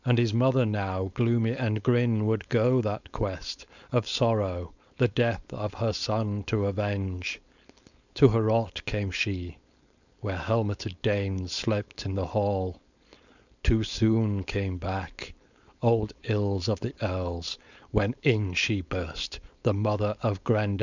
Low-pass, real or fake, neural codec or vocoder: 7.2 kHz; fake; codec, 16 kHz, 4.8 kbps, FACodec